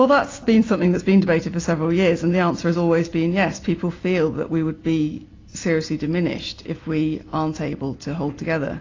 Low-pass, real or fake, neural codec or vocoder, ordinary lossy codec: 7.2 kHz; real; none; AAC, 32 kbps